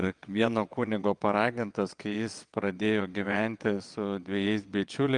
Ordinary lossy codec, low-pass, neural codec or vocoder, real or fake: Opus, 32 kbps; 9.9 kHz; vocoder, 22.05 kHz, 80 mel bands, WaveNeXt; fake